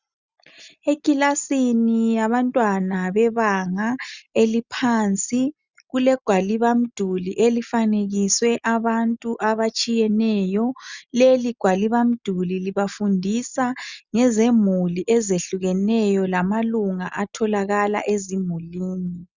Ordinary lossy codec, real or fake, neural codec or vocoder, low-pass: Opus, 64 kbps; real; none; 7.2 kHz